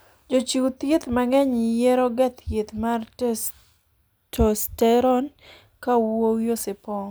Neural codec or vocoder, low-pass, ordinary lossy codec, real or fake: none; none; none; real